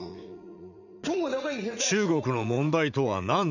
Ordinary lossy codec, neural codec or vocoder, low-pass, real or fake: none; vocoder, 44.1 kHz, 80 mel bands, Vocos; 7.2 kHz; fake